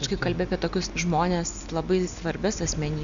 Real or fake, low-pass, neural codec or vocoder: real; 7.2 kHz; none